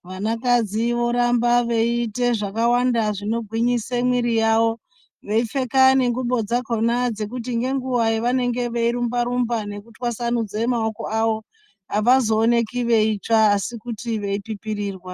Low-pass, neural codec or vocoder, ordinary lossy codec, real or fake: 14.4 kHz; none; Opus, 32 kbps; real